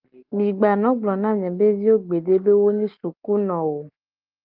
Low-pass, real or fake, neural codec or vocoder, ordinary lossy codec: 5.4 kHz; real; none; Opus, 32 kbps